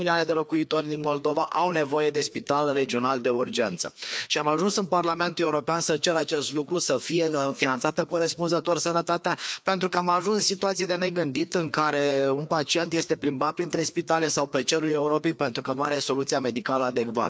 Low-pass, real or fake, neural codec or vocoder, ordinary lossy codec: none; fake; codec, 16 kHz, 2 kbps, FreqCodec, larger model; none